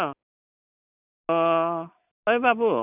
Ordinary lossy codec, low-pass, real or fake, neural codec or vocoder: none; 3.6 kHz; real; none